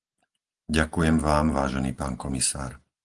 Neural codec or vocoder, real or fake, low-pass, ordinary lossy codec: none; real; 10.8 kHz; Opus, 24 kbps